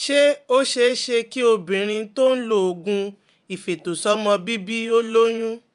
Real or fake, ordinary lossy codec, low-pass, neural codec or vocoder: fake; none; 10.8 kHz; vocoder, 24 kHz, 100 mel bands, Vocos